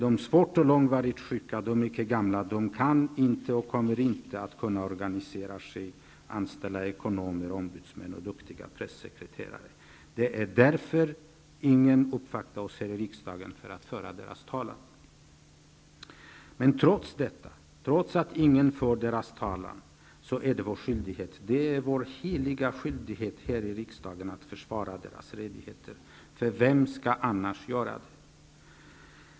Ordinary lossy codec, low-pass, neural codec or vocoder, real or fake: none; none; none; real